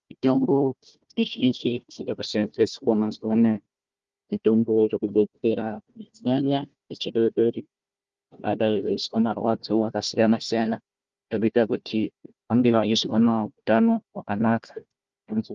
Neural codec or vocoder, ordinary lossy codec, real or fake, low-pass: codec, 16 kHz, 1 kbps, FunCodec, trained on Chinese and English, 50 frames a second; Opus, 32 kbps; fake; 7.2 kHz